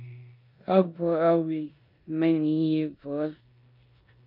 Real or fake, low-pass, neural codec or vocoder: fake; 5.4 kHz; codec, 16 kHz in and 24 kHz out, 0.9 kbps, LongCat-Audio-Codec, four codebook decoder